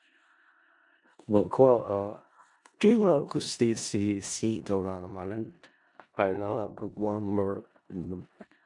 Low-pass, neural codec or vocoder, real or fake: 10.8 kHz; codec, 16 kHz in and 24 kHz out, 0.4 kbps, LongCat-Audio-Codec, four codebook decoder; fake